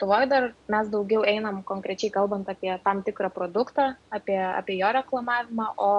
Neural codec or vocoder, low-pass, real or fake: none; 10.8 kHz; real